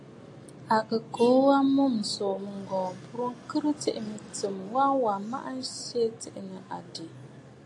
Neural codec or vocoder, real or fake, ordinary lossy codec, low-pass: none; real; AAC, 48 kbps; 10.8 kHz